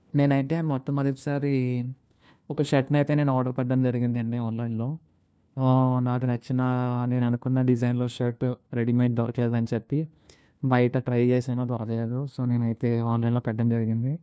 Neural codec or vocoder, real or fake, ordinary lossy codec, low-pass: codec, 16 kHz, 1 kbps, FunCodec, trained on LibriTTS, 50 frames a second; fake; none; none